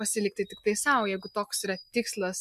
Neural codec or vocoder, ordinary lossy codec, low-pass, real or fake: none; MP3, 96 kbps; 14.4 kHz; real